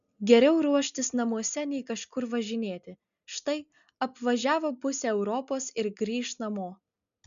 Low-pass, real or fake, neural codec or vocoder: 7.2 kHz; real; none